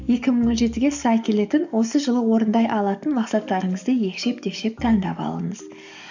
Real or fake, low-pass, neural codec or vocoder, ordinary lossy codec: fake; 7.2 kHz; codec, 44.1 kHz, 7.8 kbps, DAC; none